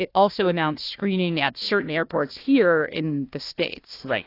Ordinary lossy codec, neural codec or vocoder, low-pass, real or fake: AAC, 32 kbps; codec, 16 kHz, 1 kbps, FunCodec, trained on Chinese and English, 50 frames a second; 5.4 kHz; fake